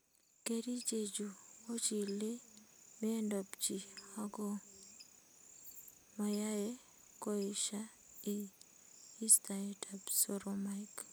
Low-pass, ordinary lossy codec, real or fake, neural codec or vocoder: none; none; real; none